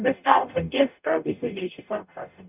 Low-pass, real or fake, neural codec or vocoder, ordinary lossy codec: 3.6 kHz; fake; codec, 44.1 kHz, 0.9 kbps, DAC; none